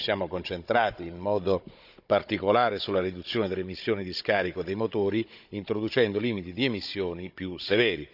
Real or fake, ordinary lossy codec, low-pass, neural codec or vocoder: fake; none; 5.4 kHz; codec, 16 kHz, 16 kbps, FunCodec, trained on Chinese and English, 50 frames a second